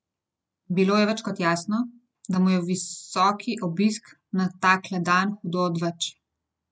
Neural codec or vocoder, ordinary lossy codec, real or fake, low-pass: none; none; real; none